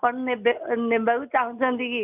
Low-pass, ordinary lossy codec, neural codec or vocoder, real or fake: 3.6 kHz; none; none; real